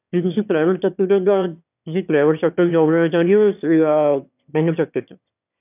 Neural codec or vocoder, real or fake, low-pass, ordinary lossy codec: autoencoder, 22.05 kHz, a latent of 192 numbers a frame, VITS, trained on one speaker; fake; 3.6 kHz; none